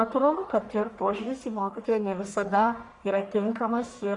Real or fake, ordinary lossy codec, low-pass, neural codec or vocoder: fake; Opus, 64 kbps; 10.8 kHz; codec, 44.1 kHz, 1.7 kbps, Pupu-Codec